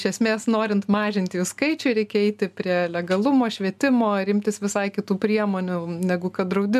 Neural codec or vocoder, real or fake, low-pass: none; real; 14.4 kHz